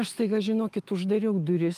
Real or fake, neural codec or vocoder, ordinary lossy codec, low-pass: fake; autoencoder, 48 kHz, 128 numbers a frame, DAC-VAE, trained on Japanese speech; Opus, 32 kbps; 14.4 kHz